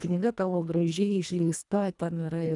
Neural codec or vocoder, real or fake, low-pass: codec, 24 kHz, 1.5 kbps, HILCodec; fake; 10.8 kHz